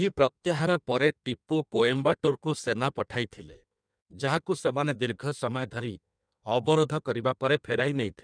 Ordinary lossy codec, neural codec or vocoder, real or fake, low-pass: none; codec, 16 kHz in and 24 kHz out, 1.1 kbps, FireRedTTS-2 codec; fake; 9.9 kHz